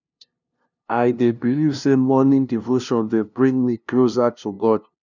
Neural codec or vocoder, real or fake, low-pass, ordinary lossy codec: codec, 16 kHz, 0.5 kbps, FunCodec, trained on LibriTTS, 25 frames a second; fake; 7.2 kHz; none